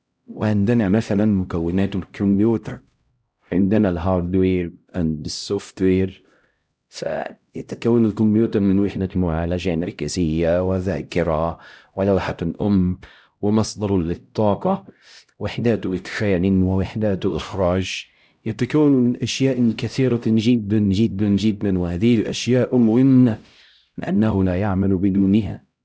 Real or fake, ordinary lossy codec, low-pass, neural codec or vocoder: fake; none; none; codec, 16 kHz, 0.5 kbps, X-Codec, HuBERT features, trained on LibriSpeech